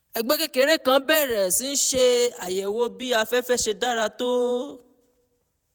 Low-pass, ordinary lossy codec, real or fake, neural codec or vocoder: none; none; fake; vocoder, 48 kHz, 128 mel bands, Vocos